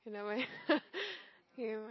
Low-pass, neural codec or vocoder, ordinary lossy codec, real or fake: 7.2 kHz; none; MP3, 24 kbps; real